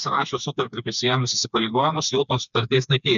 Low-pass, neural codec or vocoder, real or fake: 7.2 kHz; codec, 16 kHz, 2 kbps, FreqCodec, smaller model; fake